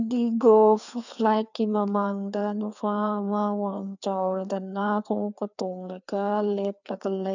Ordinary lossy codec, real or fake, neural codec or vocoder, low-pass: none; fake; codec, 16 kHz, 2 kbps, FreqCodec, larger model; 7.2 kHz